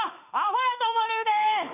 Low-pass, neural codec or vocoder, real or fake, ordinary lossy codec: 3.6 kHz; autoencoder, 48 kHz, 32 numbers a frame, DAC-VAE, trained on Japanese speech; fake; none